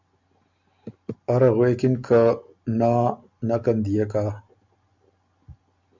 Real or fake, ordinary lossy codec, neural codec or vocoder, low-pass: real; MP3, 64 kbps; none; 7.2 kHz